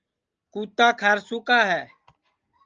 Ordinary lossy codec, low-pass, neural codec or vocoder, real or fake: Opus, 24 kbps; 7.2 kHz; none; real